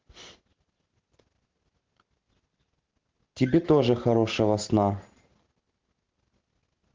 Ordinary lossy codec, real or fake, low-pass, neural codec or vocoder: Opus, 16 kbps; real; 7.2 kHz; none